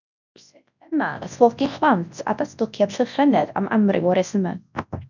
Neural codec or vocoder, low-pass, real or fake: codec, 24 kHz, 0.9 kbps, WavTokenizer, large speech release; 7.2 kHz; fake